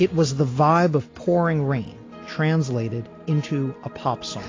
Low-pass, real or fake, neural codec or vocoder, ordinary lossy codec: 7.2 kHz; real; none; AAC, 32 kbps